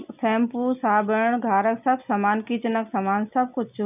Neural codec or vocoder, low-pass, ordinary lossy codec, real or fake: none; 3.6 kHz; none; real